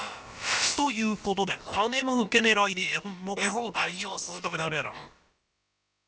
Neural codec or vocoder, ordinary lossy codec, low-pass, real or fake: codec, 16 kHz, about 1 kbps, DyCAST, with the encoder's durations; none; none; fake